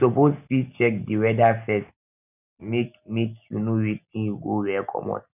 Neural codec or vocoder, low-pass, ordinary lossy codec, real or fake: none; 3.6 kHz; none; real